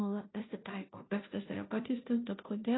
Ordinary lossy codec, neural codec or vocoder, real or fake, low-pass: AAC, 16 kbps; codec, 16 kHz, 0.5 kbps, FunCodec, trained on Chinese and English, 25 frames a second; fake; 7.2 kHz